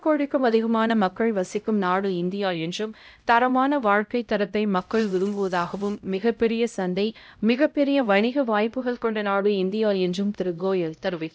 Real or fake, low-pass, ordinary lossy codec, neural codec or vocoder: fake; none; none; codec, 16 kHz, 0.5 kbps, X-Codec, HuBERT features, trained on LibriSpeech